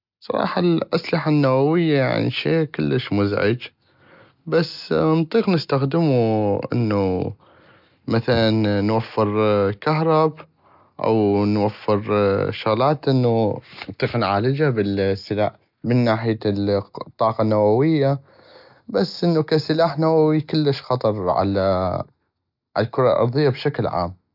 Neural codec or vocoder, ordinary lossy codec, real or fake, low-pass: none; none; real; 5.4 kHz